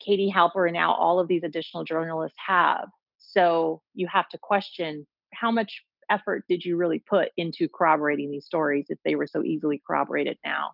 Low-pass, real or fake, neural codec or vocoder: 5.4 kHz; real; none